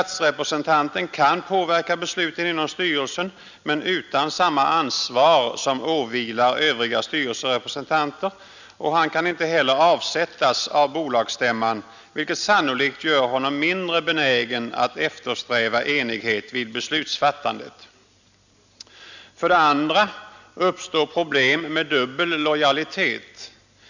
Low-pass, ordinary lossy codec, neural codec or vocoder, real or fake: 7.2 kHz; none; none; real